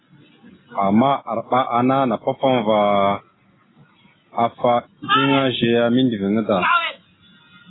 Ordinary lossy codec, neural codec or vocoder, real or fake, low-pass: AAC, 16 kbps; none; real; 7.2 kHz